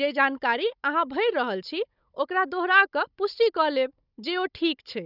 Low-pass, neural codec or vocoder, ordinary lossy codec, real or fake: 5.4 kHz; codec, 16 kHz, 16 kbps, FunCodec, trained on Chinese and English, 50 frames a second; none; fake